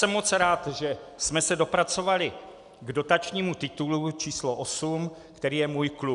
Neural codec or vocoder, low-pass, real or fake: none; 10.8 kHz; real